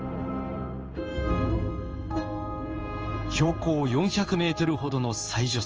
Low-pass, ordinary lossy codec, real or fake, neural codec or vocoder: 7.2 kHz; Opus, 24 kbps; fake; codec, 16 kHz in and 24 kHz out, 1 kbps, XY-Tokenizer